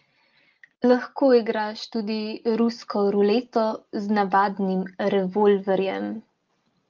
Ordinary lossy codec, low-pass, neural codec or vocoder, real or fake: Opus, 24 kbps; 7.2 kHz; none; real